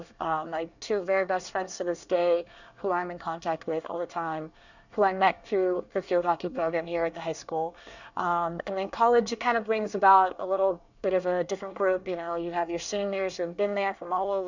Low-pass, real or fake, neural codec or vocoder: 7.2 kHz; fake; codec, 24 kHz, 1 kbps, SNAC